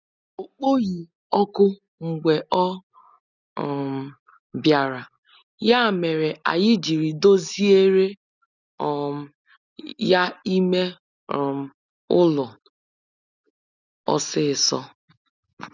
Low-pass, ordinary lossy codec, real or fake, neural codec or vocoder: 7.2 kHz; none; real; none